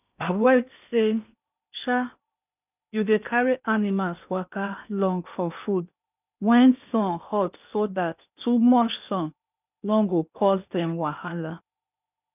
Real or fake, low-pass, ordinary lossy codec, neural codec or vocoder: fake; 3.6 kHz; none; codec, 16 kHz in and 24 kHz out, 0.8 kbps, FocalCodec, streaming, 65536 codes